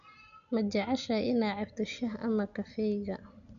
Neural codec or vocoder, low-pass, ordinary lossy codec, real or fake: none; 7.2 kHz; none; real